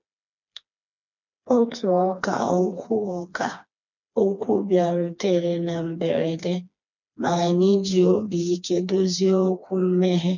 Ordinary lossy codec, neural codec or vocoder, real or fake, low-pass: none; codec, 16 kHz, 2 kbps, FreqCodec, smaller model; fake; 7.2 kHz